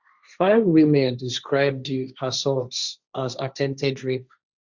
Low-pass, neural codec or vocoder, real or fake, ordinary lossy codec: 7.2 kHz; codec, 16 kHz, 1.1 kbps, Voila-Tokenizer; fake; Opus, 64 kbps